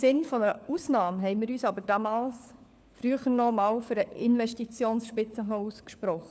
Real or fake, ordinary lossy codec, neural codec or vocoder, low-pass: fake; none; codec, 16 kHz, 4 kbps, FunCodec, trained on LibriTTS, 50 frames a second; none